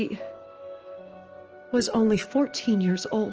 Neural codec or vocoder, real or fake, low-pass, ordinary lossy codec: autoencoder, 48 kHz, 128 numbers a frame, DAC-VAE, trained on Japanese speech; fake; 7.2 kHz; Opus, 16 kbps